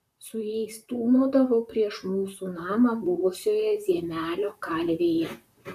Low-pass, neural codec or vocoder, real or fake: 14.4 kHz; vocoder, 44.1 kHz, 128 mel bands, Pupu-Vocoder; fake